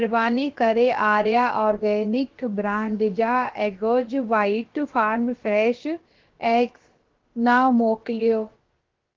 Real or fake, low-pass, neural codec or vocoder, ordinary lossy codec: fake; 7.2 kHz; codec, 16 kHz, about 1 kbps, DyCAST, with the encoder's durations; Opus, 16 kbps